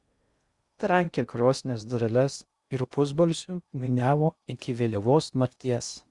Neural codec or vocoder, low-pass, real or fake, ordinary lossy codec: codec, 16 kHz in and 24 kHz out, 0.6 kbps, FocalCodec, streaming, 2048 codes; 10.8 kHz; fake; Opus, 64 kbps